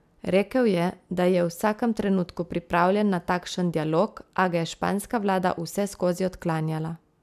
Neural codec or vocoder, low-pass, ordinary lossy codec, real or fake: none; 14.4 kHz; none; real